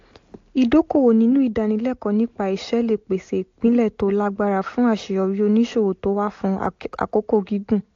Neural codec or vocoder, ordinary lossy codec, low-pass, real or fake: none; AAC, 48 kbps; 7.2 kHz; real